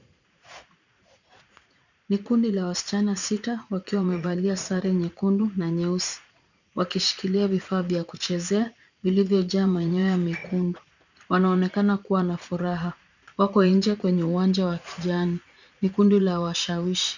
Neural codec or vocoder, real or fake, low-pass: vocoder, 44.1 kHz, 80 mel bands, Vocos; fake; 7.2 kHz